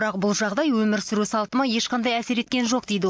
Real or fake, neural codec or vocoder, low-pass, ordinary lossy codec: real; none; none; none